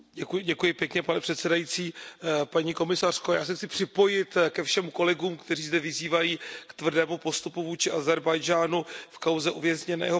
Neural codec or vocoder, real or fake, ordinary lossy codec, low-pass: none; real; none; none